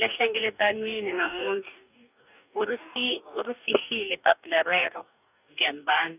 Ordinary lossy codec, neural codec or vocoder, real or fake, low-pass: none; codec, 44.1 kHz, 2.6 kbps, DAC; fake; 3.6 kHz